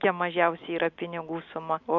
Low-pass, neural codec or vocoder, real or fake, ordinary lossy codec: 7.2 kHz; none; real; AAC, 48 kbps